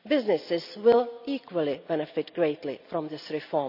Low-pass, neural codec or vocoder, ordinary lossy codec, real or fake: 5.4 kHz; none; none; real